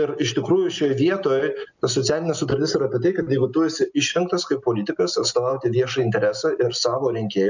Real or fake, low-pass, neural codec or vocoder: real; 7.2 kHz; none